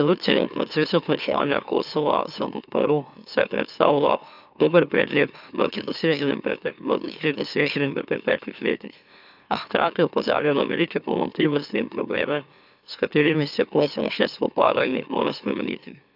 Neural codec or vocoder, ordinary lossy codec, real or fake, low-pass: autoencoder, 44.1 kHz, a latent of 192 numbers a frame, MeloTTS; none; fake; 5.4 kHz